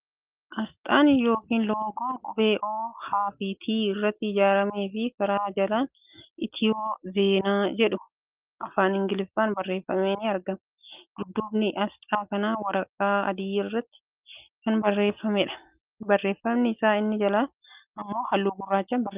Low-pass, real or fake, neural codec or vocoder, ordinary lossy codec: 3.6 kHz; real; none; Opus, 32 kbps